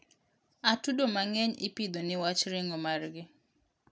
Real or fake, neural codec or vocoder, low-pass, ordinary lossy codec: real; none; none; none